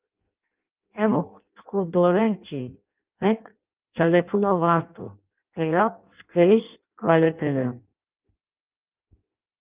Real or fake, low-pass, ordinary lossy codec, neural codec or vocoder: fake; 3.6 kHz; Opus, 24 kbps; codec, 16 kHz in and 24 kHz out, 0.6 kbps, FireRedTTS-2 codec